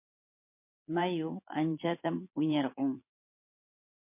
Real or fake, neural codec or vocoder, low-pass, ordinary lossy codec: fake; vocoder, 22.05 kHz, 80 mel bands, Vocos; 3.6 kHz; MP3, 24 kbps